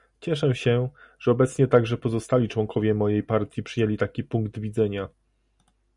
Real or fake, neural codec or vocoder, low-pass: real; none; 10.8 kHz